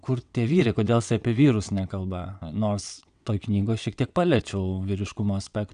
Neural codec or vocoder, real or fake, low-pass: vocoder, 22.05 kHz, 80 mel bands, WaveNeXt; fake; 9.9 kHz